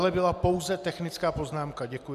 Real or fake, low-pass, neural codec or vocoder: real; 14.4 kHz; none